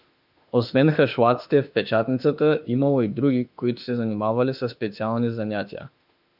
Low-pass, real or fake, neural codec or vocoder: 5.4 kHz; fake; autoencoder, 48 kHz, 32 numbers a frame, DAC-VAE, trained on Japanese speech